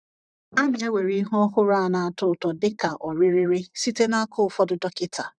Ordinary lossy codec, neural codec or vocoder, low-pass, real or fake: none; vocoder, 44.1 kHz, 128 mel bands, Pupu-Vocoder; 9.9 kHz; fake